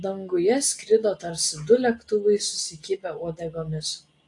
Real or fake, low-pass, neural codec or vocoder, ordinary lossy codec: real; 10.8 kHz; none; AAC, 48 kbps